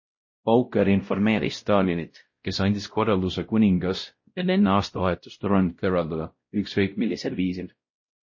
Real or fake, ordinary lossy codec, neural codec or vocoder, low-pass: fake; MP3, 32 kbps; codec, 16 kHz, 0.5 kbps, X-Codec, WavLM features, trained on Multilingual LibriSpeech; 7.2 kHz